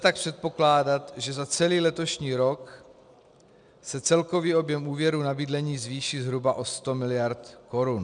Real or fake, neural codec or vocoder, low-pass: real; none; 9.9 kHz